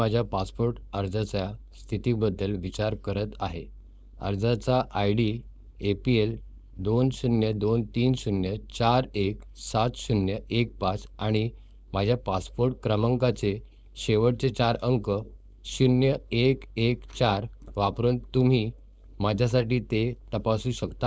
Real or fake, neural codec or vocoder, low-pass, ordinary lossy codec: fake; codec, 16 kHz, 4.8 kbps, FACodec; none; none